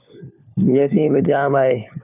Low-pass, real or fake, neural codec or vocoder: 3.6 kHz; fake; codec, 16 kHz, 4 kbps, FunCodec, trained on LibriTTS, 50 frames a second